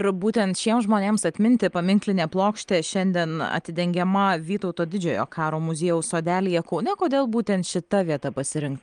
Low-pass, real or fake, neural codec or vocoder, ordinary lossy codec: 9.9 kHz; real; none; Opus, 32 kbps